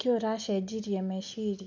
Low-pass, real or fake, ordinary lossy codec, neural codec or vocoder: 7.2 kHz; real; none; none